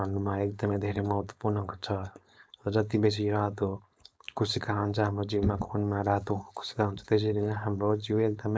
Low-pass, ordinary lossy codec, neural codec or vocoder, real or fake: none; none; codec, 16 kHz, 4.8 kbps, FACodec; fake